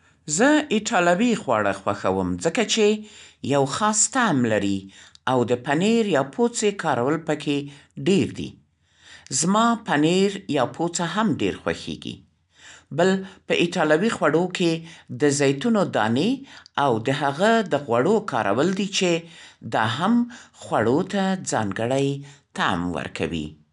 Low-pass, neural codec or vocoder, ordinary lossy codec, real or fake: 10.8 kHz; none; none; real